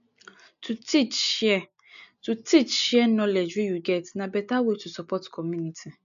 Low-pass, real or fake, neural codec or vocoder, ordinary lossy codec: 7.2 kHz; real; none; AAC, 96 kbps